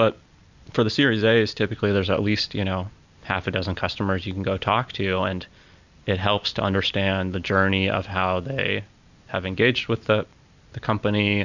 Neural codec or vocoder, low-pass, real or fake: none; 7.2 kHz; real